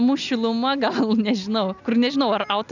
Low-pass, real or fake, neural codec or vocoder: 7.2 kHz; real; none